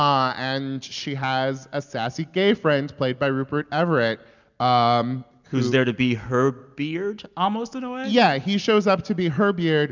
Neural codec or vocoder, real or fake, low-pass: none; real; 7.2 kHz